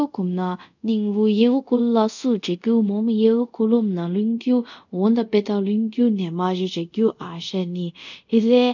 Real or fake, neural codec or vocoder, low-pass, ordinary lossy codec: fake; codec, 24 kHz, 0.5 kbps, DualCodec; 7.2 kHz; none